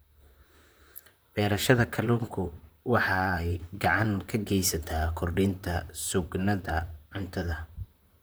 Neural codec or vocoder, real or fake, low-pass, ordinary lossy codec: vocoder, 44.1 kHz, 128 mel bands, Pupu-Vocoder; fake; none; none